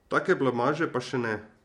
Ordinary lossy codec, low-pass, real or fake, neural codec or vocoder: MP3, 64 kbps; 19.8 kHz; real; none